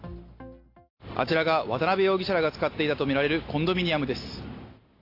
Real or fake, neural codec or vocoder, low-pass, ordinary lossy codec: real; none; 5.4 kHz; AAC, 32 kbps